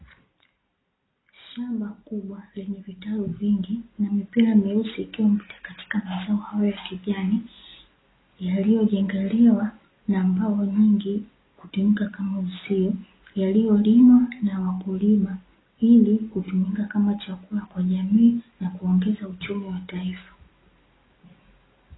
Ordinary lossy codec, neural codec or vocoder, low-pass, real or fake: AAC, 16 kbps; none; 7.2 kHz; real